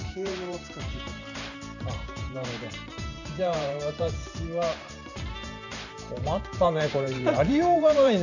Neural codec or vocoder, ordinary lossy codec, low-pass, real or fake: vocoder, 44.1 kHz, 128 mel bands every 512 samples, BigVGAN v2; none; 7.2 kHz; fake